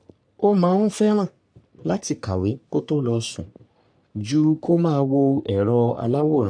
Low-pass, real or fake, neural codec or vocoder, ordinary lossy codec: 9.9 kHz; fake; codec, 44.1 kHz, 3.4 kbps, Pupu-Codec; none